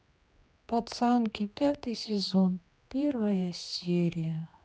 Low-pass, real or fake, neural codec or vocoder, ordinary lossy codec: none; fake; codec, 16 kHz, 2 kbps, X-Codec, HuBERT features, trained on general audio; none